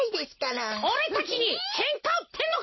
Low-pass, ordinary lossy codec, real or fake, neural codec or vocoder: 7.2 kHz; MP3, 24 kbps; real; none